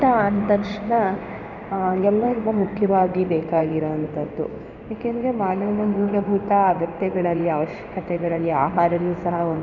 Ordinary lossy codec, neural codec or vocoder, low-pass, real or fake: none; codec, 16 kHz in and 24 kHz out, 1 kbps, XY-Tokenizer; 7.2 kHz; fake